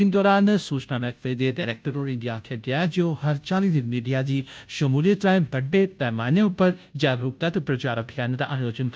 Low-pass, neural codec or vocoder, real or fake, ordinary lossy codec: none; codec, 16 kHz, 0.5 kbps, FunCodec, trained on Chinese and English, 25 frames a second; fake; none